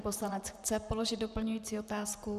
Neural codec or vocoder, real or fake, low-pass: vocoder, 44.1 kHz, 128 mel bands, Pupu-Vocoder; fake; 14.4 kHz